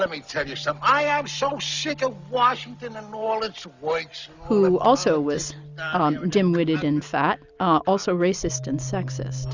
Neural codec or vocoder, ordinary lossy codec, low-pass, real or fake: none; Opus, 64 kbps; 7.2 kHz; real